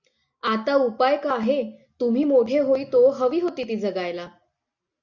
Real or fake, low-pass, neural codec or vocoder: real; 7.2 kHz; none